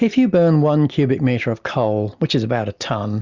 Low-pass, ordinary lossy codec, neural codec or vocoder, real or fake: 7.2 kHz; Opus, 64 kbps; none; real